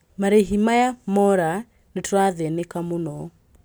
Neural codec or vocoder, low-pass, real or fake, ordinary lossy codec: none; none; real; none